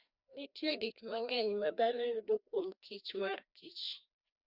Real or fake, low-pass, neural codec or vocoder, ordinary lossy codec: fake; 5.4 kHz; codec, 16 kHz, 1 kbps, FreqCodec, larger model; Opus, 64 kbps